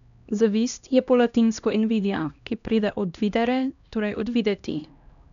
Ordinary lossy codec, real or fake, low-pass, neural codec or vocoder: none; fake; 7.2 kHz; codec, 16 kHz, 1 kbps, X-Codec, HuBERT features, trained on LibriSpeech